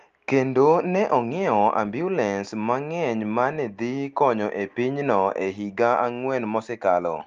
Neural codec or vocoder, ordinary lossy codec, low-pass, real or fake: none; Opus, 32 kbps; 7.2 kHz; real